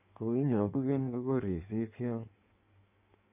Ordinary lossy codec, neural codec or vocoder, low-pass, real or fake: none; codec, 16 kHz in and 24 kHz out, 1.1 kbps, FireRedTTS-2 codec; 3.6 kHz; fake